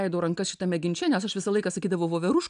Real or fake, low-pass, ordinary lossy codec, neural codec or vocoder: real; 9.9 kHz; AAC, 96 kbps; none